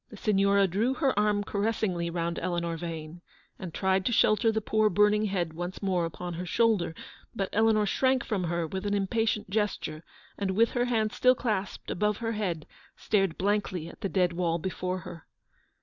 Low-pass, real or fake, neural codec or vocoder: 7.2 kHz; real; none